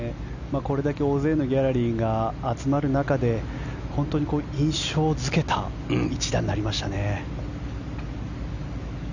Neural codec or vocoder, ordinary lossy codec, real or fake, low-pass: none; none; real; 7.2 kHz